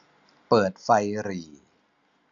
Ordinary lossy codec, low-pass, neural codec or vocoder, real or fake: none; 7.2 kHz; none; real